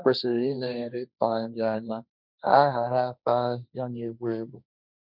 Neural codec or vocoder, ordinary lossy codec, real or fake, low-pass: codec, 16 kHz, 1.1 kbps, Voila-Tokenizer; none; fake; 5.4 kHz